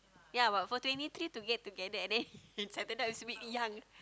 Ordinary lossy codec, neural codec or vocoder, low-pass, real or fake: none; none; none; real